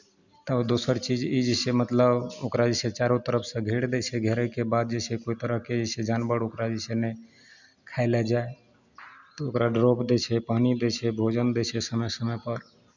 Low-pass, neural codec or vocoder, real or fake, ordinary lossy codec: 7.2 kHz; none; real; none